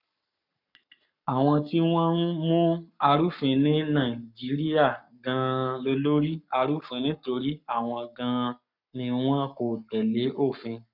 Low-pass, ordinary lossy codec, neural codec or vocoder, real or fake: 5.4 kHz; none; codec, 44.1 kHz, 7.8 kbps, Pupu-Codec; fake